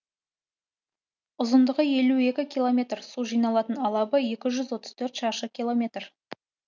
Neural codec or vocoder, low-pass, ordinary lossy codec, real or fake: none; 7.2 kHz; none; real